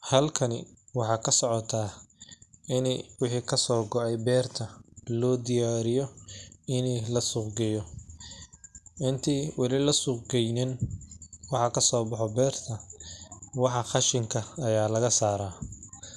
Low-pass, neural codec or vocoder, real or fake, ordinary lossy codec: none; none; real; none